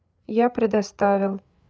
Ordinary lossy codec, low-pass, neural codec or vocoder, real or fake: none; none; codec, 16 kHz, 8 kbps, FreqCodec, larger model; fake